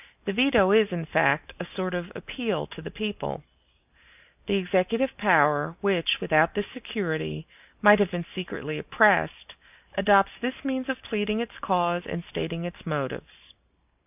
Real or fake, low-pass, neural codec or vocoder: real; 3.6 kHz; none